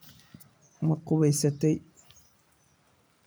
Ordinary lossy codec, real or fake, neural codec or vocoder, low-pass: none; real; none; none